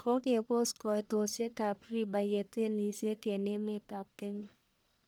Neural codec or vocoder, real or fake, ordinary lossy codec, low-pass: codec, 44.1 kHz, 1.7 kbps, Pupu-Codec; fake; none; none